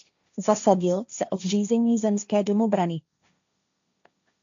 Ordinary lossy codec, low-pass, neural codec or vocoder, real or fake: MP3, 64 kbps; 7.2 kHz; codec, 16 kHz, 1.1 kbps, Voila-Tokenizer; fake